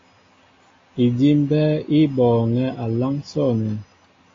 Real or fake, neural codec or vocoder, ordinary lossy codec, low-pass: real; none; AAC, 32 kbps; 7.2 kHz